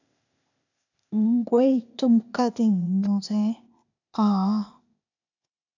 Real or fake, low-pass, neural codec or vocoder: fake; 7.2 kHz; codec, 16 kHz, 0.8 kbps, ZipCodec